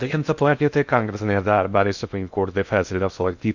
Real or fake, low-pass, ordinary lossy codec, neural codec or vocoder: fake; 7.2 kHz; none; codec, 16 kHz in and 24 kHz out, 0.6 kbps, FocalCodec, streaming, 2048 codes